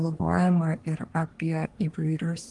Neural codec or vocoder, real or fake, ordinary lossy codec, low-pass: codec, 24 kHz, 1 kbps, SNAC; fake; Opus, 24 kbps; 10.8 kHz